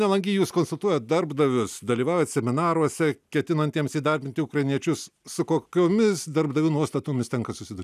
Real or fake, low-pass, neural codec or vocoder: real; 14.4 kHz; none